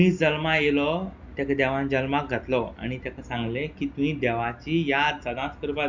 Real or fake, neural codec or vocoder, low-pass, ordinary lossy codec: real; none; 7.2 kHz; Opus, 64 kbps